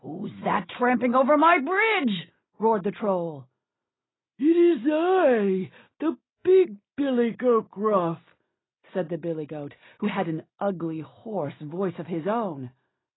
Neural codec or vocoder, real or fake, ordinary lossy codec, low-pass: none; real; AAC, 16 kbps; 7.2 kHz